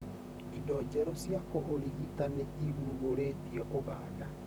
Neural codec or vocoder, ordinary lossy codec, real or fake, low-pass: vocoder, 44.1 kHz, 128 mel bands, Pupu-Vocoder; none; fake; none